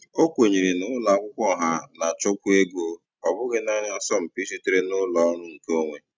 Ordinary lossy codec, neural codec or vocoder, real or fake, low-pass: none; none; real; none